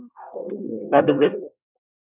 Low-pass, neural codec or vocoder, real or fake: 3.6 kHz; codec, 24 kHz, 1 kbps, SNAC; fake